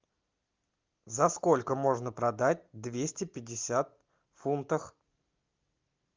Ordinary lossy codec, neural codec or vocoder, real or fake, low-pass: Opus, 24 kbps; none; real; 7.2 kHz